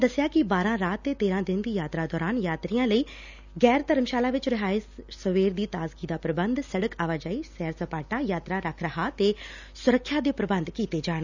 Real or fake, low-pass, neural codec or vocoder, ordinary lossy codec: real; 7.2 kHz; none; none